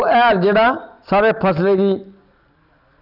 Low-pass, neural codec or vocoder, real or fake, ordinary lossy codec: 5.4 kHz; none; real; none